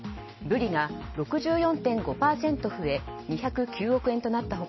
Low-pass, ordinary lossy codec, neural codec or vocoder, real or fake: 7.2 kHz; MP3, 24 kbps; none; real